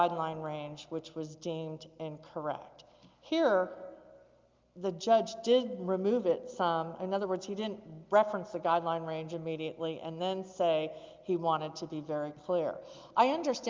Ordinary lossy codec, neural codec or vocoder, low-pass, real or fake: Opus, 32 kbps; none; 7.2 kHz; real